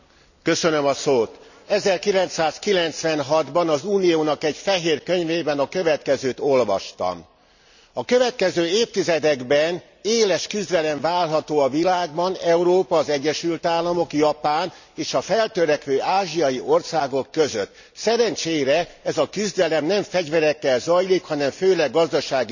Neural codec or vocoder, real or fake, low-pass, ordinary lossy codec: none; real; 7.2 kHz; none